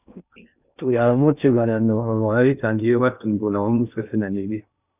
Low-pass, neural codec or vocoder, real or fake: 3.6 kHz; codec, 16 kHz in and 24 kHz out, 0.6 kbps, FocalCodec, streaming, 2048 codes; fake